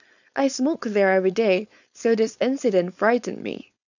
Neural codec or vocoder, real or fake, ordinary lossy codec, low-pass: codec, 16 kHz, 4.8 kbps, FACodec; fake; none; 7.2 kHz